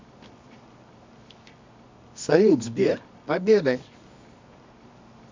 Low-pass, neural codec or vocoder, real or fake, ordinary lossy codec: 7.2 kHz; codec, 24 kHz, 0.9 kbps, WavTokenizer, medium music audio release; fake; MP3, 64 kbps